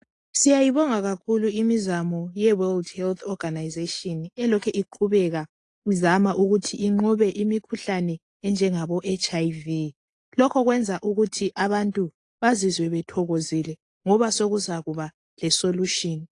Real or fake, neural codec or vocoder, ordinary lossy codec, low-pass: real; none; AAC, 48 kbps; 10.8 kHz